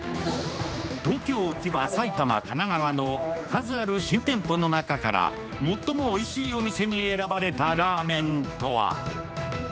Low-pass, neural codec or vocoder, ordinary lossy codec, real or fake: none; codec, 16 kHz, 2 kbps, X-Codec, HuBERT features, trained on general audio; none; fake